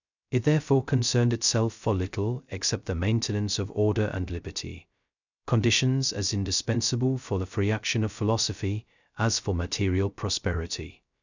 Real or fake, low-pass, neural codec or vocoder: fake; 7.2 kHz; codec, 16 kHz, 0.2 kbps, FocalCodec